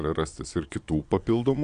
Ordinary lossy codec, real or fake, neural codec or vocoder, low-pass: Opus, 64 kbps; fake; vocoder, 22.05 kHz, 80 mel bands, Vocos; 9.9 kHz